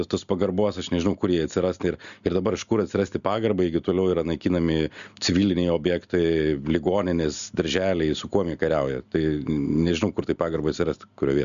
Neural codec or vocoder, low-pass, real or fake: none; 7.2 kHz; real